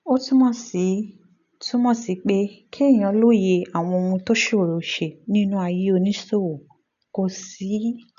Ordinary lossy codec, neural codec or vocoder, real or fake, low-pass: none; none; real; 7.2 kHz